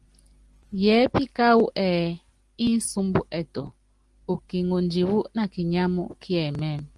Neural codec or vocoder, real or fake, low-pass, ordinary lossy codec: none; real; 10.8 kHz; Opus, 24 kbps